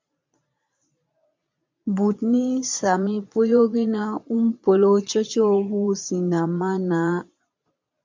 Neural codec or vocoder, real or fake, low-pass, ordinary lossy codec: vocoder, 22.05 kHz, 80 mel bands, Vocos; fake; 7.2 kHz; MP3, 64 kbps